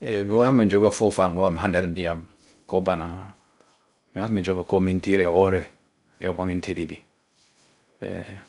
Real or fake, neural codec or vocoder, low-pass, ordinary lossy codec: fake; codec, 16 kHz in and 24 kHz out, 0.6 kbps, FocalCodec, streaming, 2048 codes; 10.8 kHz; none